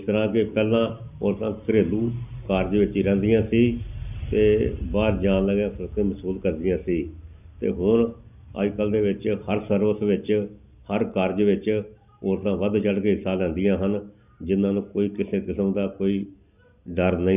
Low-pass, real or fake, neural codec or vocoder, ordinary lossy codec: 3.6 kHz; real; none; none